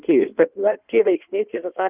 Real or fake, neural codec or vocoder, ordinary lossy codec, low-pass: fake; codec, 16 kHz in and 24 kHz out, 1.1 kbps, FireRedTTS-2 codec; Opus, 24 kbps; 3.6 kHz